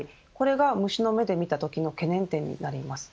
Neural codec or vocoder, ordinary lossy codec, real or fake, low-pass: none; none; real; none